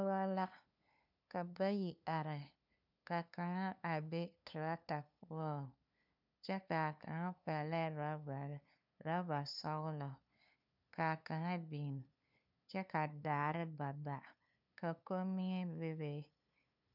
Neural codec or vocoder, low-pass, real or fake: codec, 16 kHz, 2 kbps, FunCodec, trained on LibriTTS, 25 frames a second; 5.4 kHz; fake